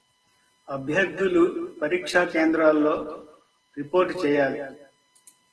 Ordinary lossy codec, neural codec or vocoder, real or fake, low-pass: Opus, 16 kbps; none; real; 10.8 kHz